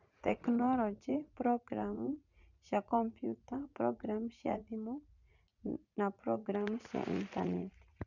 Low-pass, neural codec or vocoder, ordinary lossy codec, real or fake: 7.2 kHz; vocoder, 44.1 kHz, 80 mel bands, Vocos; none; fake